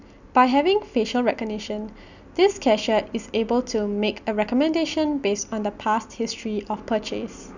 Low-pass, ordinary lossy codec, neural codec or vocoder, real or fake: 7.2 kHz; none; none; real